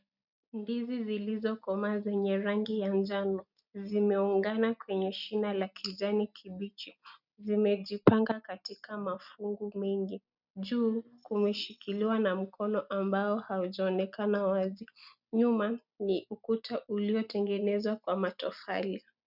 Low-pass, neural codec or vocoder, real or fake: 5.4 kHz; none; real